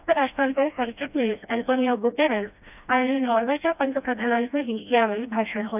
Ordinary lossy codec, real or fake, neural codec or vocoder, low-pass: none; fake; codec, 16 kHz, 1 kbps, FreqCodec, smaller model; 3.6 kHz